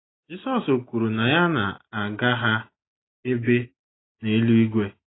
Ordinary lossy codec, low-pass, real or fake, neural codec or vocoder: AAC, 16 kbps; 7.2 kHz; real; none